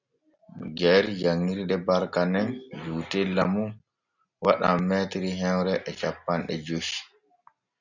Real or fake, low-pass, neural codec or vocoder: real; 7.2 kHz; none